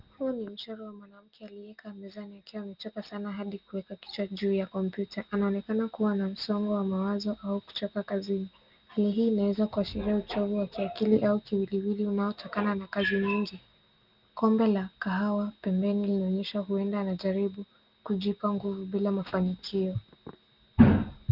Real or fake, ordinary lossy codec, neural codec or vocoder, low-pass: real; Opus, 32 kbps; none; 5.4 kHz